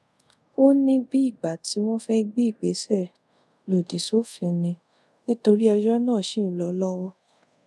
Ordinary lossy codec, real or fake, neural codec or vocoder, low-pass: none; fake; codec, 24 kHz, 0.5 kbps, DualCodec; none